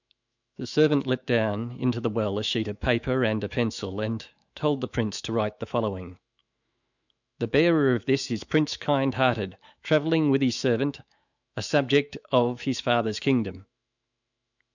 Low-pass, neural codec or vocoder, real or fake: 7.2 kHz; autoencoder, 48 kHz, 128 numbers a frame, DAC-VAE, trained on Japanese speech; fake